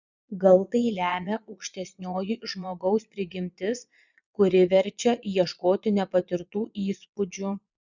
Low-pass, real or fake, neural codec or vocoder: 7.2 kHz; fake; vocoder, 22.05 kHz, 80 mel bands, Vocos